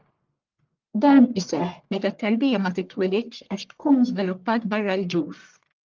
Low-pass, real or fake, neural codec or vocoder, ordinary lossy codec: 7.2 kHz; fake; codec, 44.1 kHz, 1.7 kbps, Pupu-Codec; Opus, 32 kbps